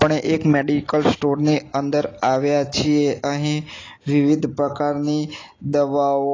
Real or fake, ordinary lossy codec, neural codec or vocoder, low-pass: real; AAC, 32 kbps; none; 7.2 kHz